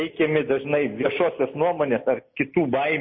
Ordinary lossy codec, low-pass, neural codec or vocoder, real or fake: MP3, 24 kbps; 7.2 kHz; none; real